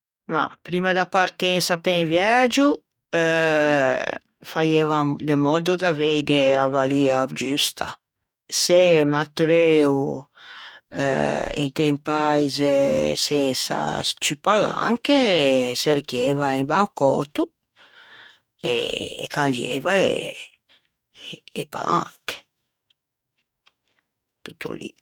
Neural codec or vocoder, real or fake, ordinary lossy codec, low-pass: codec, 44.1 kHz, 2.6 kbps, DAC; fake; none; 19.8 kHz